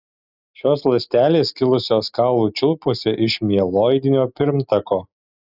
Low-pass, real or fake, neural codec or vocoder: 5.4 kHz; real; none